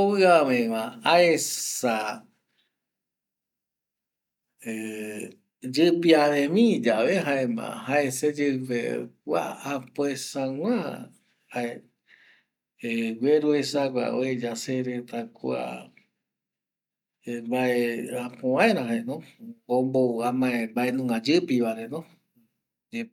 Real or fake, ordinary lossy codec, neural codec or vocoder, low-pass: real; none; none; 19.8 kHz